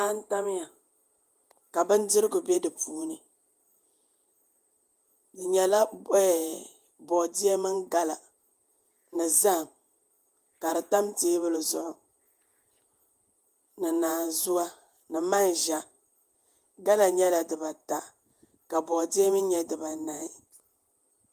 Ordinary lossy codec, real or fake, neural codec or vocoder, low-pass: Opus, 32 kbps; fake; vocoder, 48 kHz, 128 mel bands, Vocos; 14.4 kHz